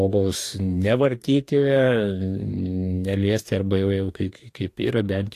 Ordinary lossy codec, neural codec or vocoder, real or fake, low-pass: AAC, 64 kbps; codec, 44.1 kHz, 2.6 kbps, DAC; fake; 14.4 kHz